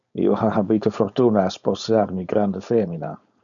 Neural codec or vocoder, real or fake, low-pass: codec, 16 kHz, 4.8 kbps, FACodec; fake; 7.2 kHz